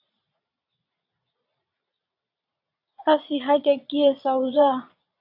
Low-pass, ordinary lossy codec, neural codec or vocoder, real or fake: 5.4 kHz; AAC, 48 kbps; vocoder, 22.05 kHz, 80 mel bands, Vocos; fake